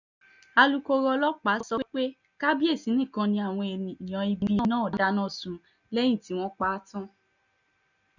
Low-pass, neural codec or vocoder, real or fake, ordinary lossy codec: 7.2 kHz; none; real; none